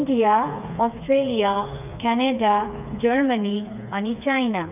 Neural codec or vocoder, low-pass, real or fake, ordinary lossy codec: codec, 16 kHz, 4 kbps, FreqCodec, smaller model; 3.6 kHz; fake; none